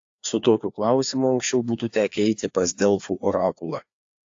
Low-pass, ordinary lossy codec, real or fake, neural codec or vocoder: 7.2 kHz; AAC, 48 kbps; fake; codec, 16 kHz, 2 kbps, FreqCodec, larger model